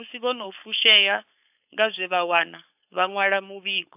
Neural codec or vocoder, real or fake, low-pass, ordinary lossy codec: codec, 16 kHz, 4.8 kbps, FACodec; fake; 3.6 kHz; AAC, 32 kbps